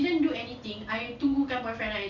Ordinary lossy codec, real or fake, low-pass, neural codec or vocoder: none; real; 7.2 kHz; none